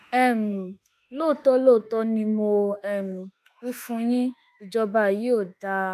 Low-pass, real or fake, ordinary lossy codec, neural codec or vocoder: 14.4 kHz; fake; none; autoencoder, 48 kHz, 32 numbers a frame, DAC-VAE, trained on Japanese speech